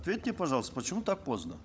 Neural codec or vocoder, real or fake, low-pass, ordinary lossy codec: codec, 16 kHz, 16 kbps, FunCodec, trained on LibriTTS, 50 frames a second; fake; none; none